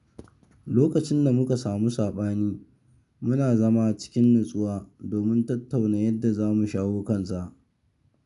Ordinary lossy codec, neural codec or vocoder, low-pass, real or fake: none; none; 10.8 kHz; real